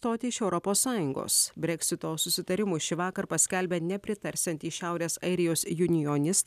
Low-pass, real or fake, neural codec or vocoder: 14.4 kHz; real; none